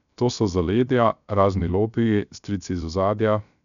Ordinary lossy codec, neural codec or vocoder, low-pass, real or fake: none; codec, 16 kHz, 0.3 kbps, FocalCodec; 7.2 kHz; fake